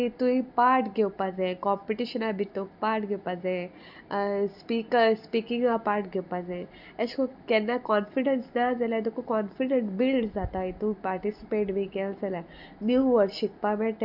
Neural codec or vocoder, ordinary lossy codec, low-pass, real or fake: none; none; 5.4 kHz; real